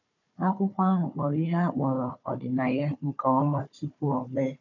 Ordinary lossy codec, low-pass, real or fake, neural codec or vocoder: none; 7.2 kHz; fake; codec, 16 kHz, 4 kbps, FunCodec, trained on Chinese and English, 50 frames a second